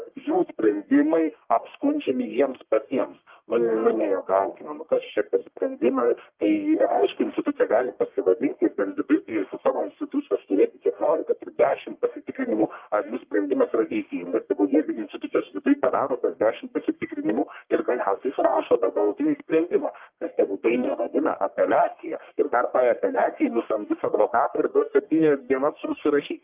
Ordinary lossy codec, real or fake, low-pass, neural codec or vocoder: Opus, 24 kbps; fake; 3.6 kHz; codec, 44.1 kHz, 1.7 kbps, Pupu-Codec